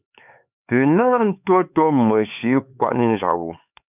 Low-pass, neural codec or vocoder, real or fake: 3.6 kHz; codec, 16 kHz, 4 kbps, X-Codec, HuBERT features, trained on LibriSpeech; fake